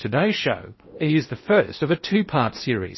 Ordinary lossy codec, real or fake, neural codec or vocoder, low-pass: MP3, 24 kbps; fake; codec, 16 kHz in and 24 kHz out, 0.8 kbps, FocalCodec, streaming, 65536 codes; 7.2 kHz